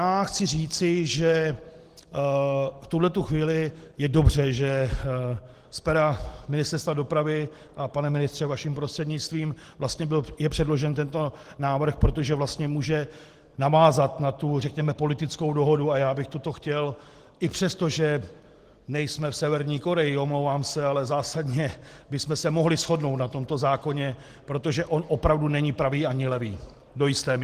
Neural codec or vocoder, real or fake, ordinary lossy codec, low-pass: none; real; Opus, 16 kbps; 14.4 kHz